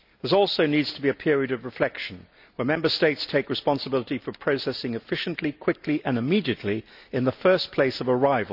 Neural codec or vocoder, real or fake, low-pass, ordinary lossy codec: none; real; 5.4 kHz; none